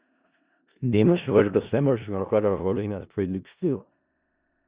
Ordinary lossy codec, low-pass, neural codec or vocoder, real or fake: Opus, 64 kbps; 3.6 kHz; codec, 16 kHz in and 24 kHz out, 0.4 kbps, LongCat-Audio-Codec, four codebook decoder; fake